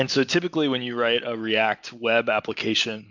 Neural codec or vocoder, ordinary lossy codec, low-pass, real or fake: none; MP3, 64 kbps; 7.2 kHz; real